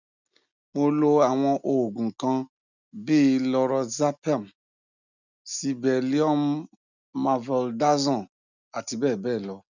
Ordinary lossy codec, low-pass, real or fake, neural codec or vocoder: none; 7.2 kHz; real; none